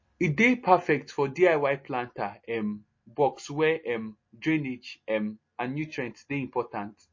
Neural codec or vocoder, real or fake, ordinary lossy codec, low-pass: none; real; MP3, 32 kbps; 7.2 kHz